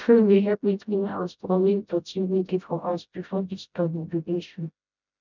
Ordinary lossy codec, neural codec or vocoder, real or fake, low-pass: none; codec, 16 kHz, 0.5 kbps, FreqCodec, smaller model; fake; 7.2 kHz